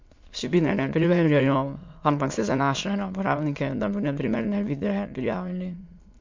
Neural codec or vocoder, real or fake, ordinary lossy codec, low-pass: autoencoder, 22.05 kHz, a latent of 192 numbers a frame, VITS, trained on many speakers; fake; MP3, 48 kbps; 7.2 kHz